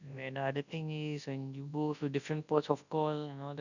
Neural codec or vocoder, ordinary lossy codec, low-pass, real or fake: codec, 24 kHz, 0.9 kbps, WavTokenizer, large speech release; none; 7.2 kHz; fake